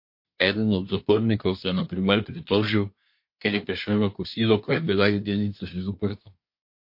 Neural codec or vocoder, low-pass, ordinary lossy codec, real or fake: codec, 24 kHz, 1 kbps, SNAC; 5.4 kHz; MP3, 32 kbps; fake